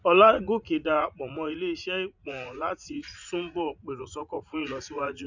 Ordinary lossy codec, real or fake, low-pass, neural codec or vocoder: none; fake; 7.2 kHz; vocoder, 44.1 kHz, 80 mel bands, Vocos